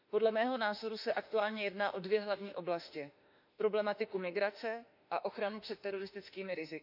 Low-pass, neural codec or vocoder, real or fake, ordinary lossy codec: 5.4 kHz; autoencoder, 48 kHz, 32 numbers a frame, DAC-VAE, trained on Japanese speech; fake; none